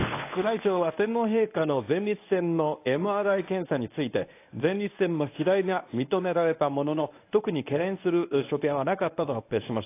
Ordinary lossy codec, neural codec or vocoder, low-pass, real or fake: AAC, 24 kbps; codec, 24 kHz, 0.9 kbps, WavTokenizer, medium speech release version 1; 3.6 kHz; fake